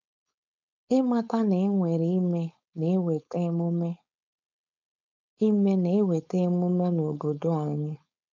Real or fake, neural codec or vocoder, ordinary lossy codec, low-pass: fake; codec, 16 kHz, 4.8 kbps, FACodec; none; 7.2 kHz